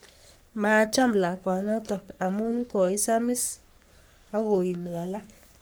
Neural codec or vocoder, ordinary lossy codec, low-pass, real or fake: codec, 44.1 kHz, 3.4 kbps, Pupu-Codec; none; none; fake